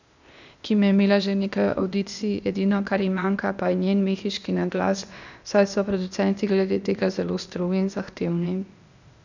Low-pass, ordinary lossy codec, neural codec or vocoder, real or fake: 7.2 kHz; none; codec, 16 kHz, 0.8 kbps, ZipCodec; fake